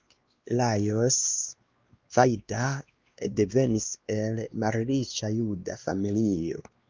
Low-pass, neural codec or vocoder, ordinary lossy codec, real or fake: 7.2 kHz; codec, 16 kHz, 2 kbps, X-Codec, WavLM features, trained on Multilingual LibriSpeech; Opus, 32 kbps; fake